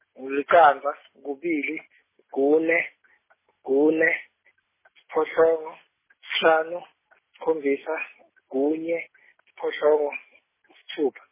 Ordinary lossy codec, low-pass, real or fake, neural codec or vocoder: MP3, 16 kbps; 3.6 kHz; real; none